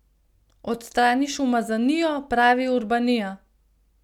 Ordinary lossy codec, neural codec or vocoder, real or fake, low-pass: none; none; real; 19.8 kHz